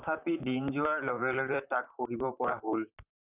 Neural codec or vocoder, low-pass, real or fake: vocoder, 44.1 kHz, 128 mel bands, Pupu-Vocoder; 3.6 kHz; fake